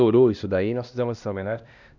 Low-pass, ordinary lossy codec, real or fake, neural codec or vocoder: 7.2 kHz; none; fake; codec, 16 kHz, 1 kbps, X-Codec, HuBERT features, trained on LibriSpeech